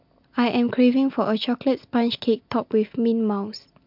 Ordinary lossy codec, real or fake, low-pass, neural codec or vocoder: MP3, 48 kbps; real; 5.4 kHz; none